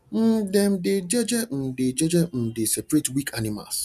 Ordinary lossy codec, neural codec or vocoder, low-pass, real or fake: none; none; 14.4 kHz; real